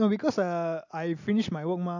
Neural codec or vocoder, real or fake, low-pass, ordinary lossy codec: none; real; 7.2 kHz; none